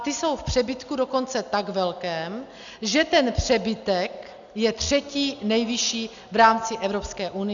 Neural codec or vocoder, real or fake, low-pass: none; real; 7.2 kHz